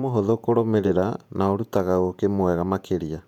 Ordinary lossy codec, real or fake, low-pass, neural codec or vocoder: none; fake; 19.8 kHz; vocoder, 48 kHz, 128 mel bands, Vocos